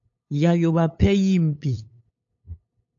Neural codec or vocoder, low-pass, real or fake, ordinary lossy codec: codec, 16 kHz, 8 kbps, FunCodec, trained on LibriTTS, 25 frames a second; 7.2 kHz; fake; AAC, 64 kbps